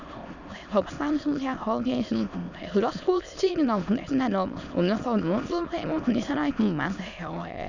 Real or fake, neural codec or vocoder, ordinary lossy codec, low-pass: fake; autoencoder, 22.05 kHz, a latent of 192 numbers a frame, VITS, trained on many speakers; none; 7.2 kHz